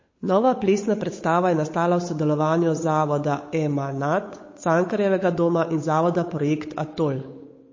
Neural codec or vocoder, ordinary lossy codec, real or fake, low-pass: codec, 16 kHz, 8 kbps, FunCodec, trained on Chinese and English, 25 frames a second; MP3, 32 kbps; fake; 7.2 kHz